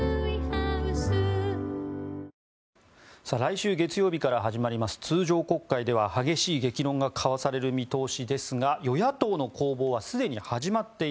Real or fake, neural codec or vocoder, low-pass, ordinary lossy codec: real; none; none; none